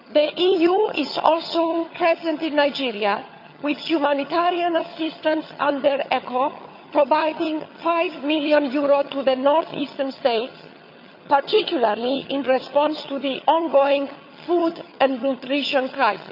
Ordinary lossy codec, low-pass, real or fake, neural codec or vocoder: none; 5.4 kHz; fake; vocoder, 22.05 kHz, 80 mel bands, HiFi-GAN